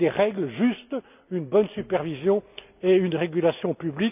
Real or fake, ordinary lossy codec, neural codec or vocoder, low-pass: real; none; none; 3.6 kHz